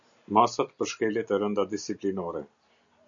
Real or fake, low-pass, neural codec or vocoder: real; 7.2 kHz; none